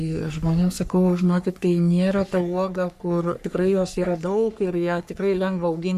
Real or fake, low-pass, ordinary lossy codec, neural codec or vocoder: fake; 14.4 kHz; AAC, 96 kbps; codec, 44.1 kHz, 3.4 kbps, Pupu-Codec